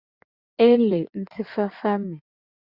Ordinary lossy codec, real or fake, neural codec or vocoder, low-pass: Opus, 64 kbps; fake; codec, 16 kHz in and 24 kHz out, 2.2 kbps, FireRedTTS-2 codec; 5.4 kHz